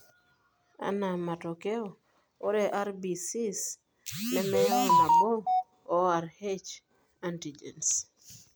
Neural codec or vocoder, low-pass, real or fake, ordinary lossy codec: none; none; real; none